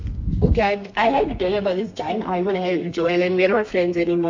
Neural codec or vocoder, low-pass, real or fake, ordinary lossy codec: codec, 32 kHz, 1.9 kbps, SNAC; 7.2 kHz; fake; MP3, 48 kbps